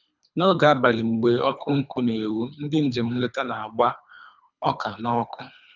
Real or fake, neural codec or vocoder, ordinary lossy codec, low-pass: fake; codec, 24 kHz, 3 kbps, HILCodec; none; 7.2 kHz